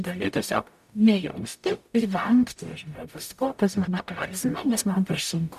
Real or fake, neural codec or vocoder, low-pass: fake; codec, 44.1 kHz, 0.9 kbps, DAC; 14.4 kHz